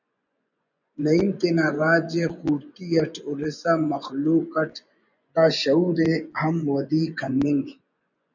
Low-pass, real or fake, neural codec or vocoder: 7.2 kHz; fake; vocoder, 24 kHz, 100 mel bands, Vocos